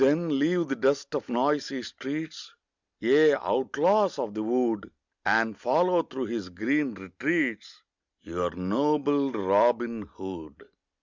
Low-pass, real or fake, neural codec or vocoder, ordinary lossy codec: 7.2 kHz; real; none; Opus, 64 kbps